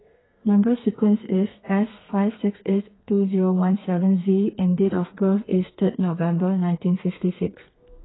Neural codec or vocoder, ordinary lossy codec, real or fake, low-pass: codec, 32 kHz, 1.9 kbps, SNAC; AAC, 16 kbps; fake; 7.2 kHz